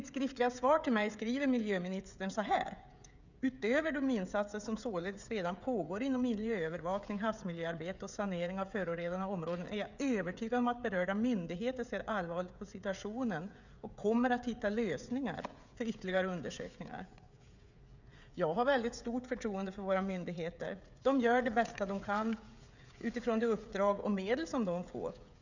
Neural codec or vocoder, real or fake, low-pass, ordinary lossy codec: codec, 16 kHz, 16 kbps, FreqCodec, smaller model; fake; 7.2 kHz; none